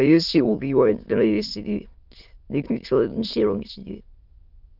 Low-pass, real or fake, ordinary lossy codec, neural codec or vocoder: 5.4 kHz; fake; Opus, 24 kbps; autoencoder, 22.05 kHz, a latent of 192 numbers a frame, VITS, trained on many speakers